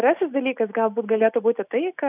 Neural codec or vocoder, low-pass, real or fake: none; 3.6 kHz; real